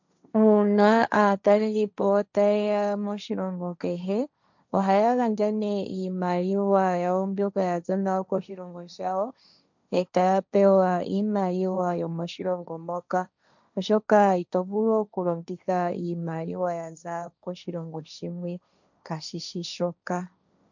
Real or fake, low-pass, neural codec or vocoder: fake; 7.2 kHz; codec, 16 kHz, 1.1 kbps, Voila-Tokenizer